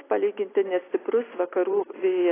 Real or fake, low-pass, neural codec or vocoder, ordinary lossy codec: real; 3.6 kHz; none; AAC, 16 kbps